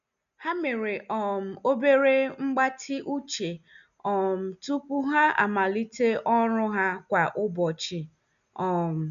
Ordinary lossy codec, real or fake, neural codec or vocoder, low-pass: MP3, 96 kbps; real; none; 7.2 kHz